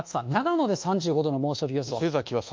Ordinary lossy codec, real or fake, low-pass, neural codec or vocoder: Opus, 24 kbps; fake; 7.2 kHz; codec, 24 kHz, 1.2 kbps, DualCodec